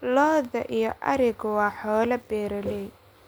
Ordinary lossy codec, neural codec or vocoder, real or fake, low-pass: none; none; real; none